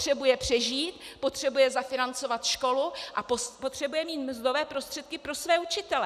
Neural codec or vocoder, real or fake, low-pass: none; real; 14.4 kHz